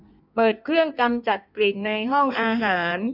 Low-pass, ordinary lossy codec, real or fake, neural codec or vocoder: 5.4 kHz; none; fake; codec, 16 kHz in and 24 kHz out, 1.1 kbps, FireRedTTS-2 codec